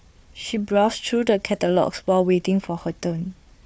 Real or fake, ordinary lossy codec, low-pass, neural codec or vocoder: fake; none; none; codec, 16 kHz, 4 kbps, FunCodec, trained on Chinese and English, 50 frames a second